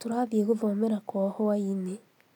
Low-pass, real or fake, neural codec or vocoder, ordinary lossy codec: 19.8 kHz; real; none; none